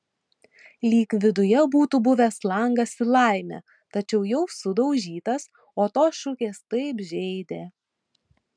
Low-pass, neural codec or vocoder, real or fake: 9.9 kHz; none; real